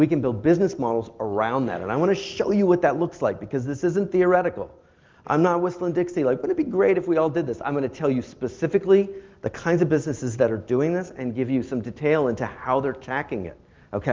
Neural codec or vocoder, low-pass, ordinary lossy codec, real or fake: none; 7.2 kHz; Opus, 32 kbps; real